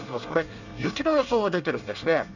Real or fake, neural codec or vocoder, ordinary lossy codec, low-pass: fake; codec, 24 kHz, 1 kbps, SNAC; none; 7.2 kHz